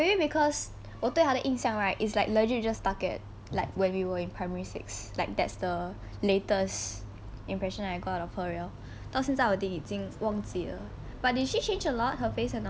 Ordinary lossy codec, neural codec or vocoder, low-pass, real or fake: none; none; none; real